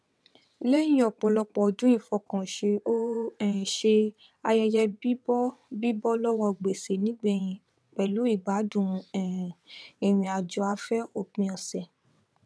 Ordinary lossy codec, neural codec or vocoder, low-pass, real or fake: none; vocoder, 22.05 kHz, 80 mel bands, WaveNeXt; none; fake